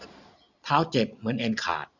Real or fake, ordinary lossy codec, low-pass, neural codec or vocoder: real; none; 7.2 kHz; none